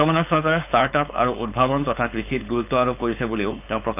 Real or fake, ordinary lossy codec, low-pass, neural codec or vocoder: fake; none; 3.6 kHz; codec, 16 kHz, 4.8 kbps, FACodec